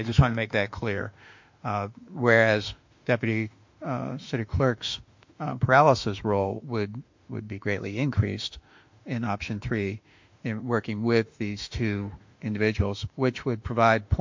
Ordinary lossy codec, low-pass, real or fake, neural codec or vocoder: MP3, 48 kbps; 7.2 kHz; fake; autoencoder, 48 kHz, 32 numbers a frame, DAC-VAE, trained on Japanese speech